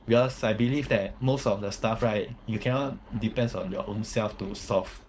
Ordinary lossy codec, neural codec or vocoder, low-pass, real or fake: none; codec, 16 kHz, 4.8 kbps, FACodec; none; fake